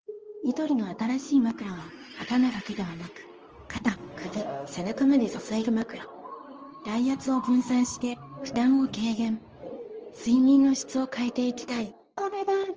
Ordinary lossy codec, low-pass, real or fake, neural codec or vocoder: Opus, 24 kbps; 7.2 kHz; fake; codec, 24 kHz, 0.9 kbps, WavTokenizer, medium speech release version 2